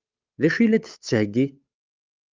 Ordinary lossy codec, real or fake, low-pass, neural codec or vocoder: Opus, 24 kbps; fake; 7.2 kHz; codec, 16 kHz, 8 kbps, FunCodec, trained on Chinese and English, 25 frames a second